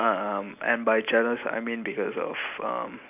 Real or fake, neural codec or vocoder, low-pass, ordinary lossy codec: real; none; 3.6 kHz; none